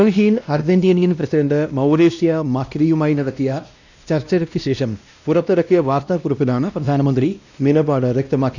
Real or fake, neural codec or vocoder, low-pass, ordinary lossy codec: fake; codec, 16 kHz, 1 kbps, X-Codec, WavLM features, trained on Multilingual LibriSpeech; 7.2 kHz; none